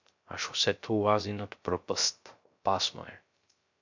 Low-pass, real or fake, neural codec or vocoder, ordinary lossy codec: 7.2 kHz; fake; codec, 16 kHz, 0.3 kbps, FocalCodec; MP3, 64 kbps